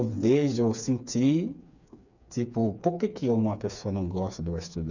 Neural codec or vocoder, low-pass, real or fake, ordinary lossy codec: codec, 16 kHz, 4 kbps, FreqCodec, smaller model; 7.2 kHz; fake; none